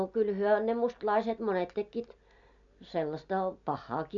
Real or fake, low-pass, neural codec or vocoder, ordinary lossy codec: real; 7.2 kHz; none; none